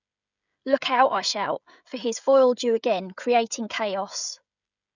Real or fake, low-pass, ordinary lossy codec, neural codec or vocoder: fake; 7.2 kHz; none; codec, 16 kHz, 16 kbps, FreqCodec, smaller model